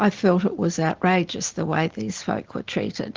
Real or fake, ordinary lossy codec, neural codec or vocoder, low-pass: real; Opus, 16 kbps; none; 7.2 kHz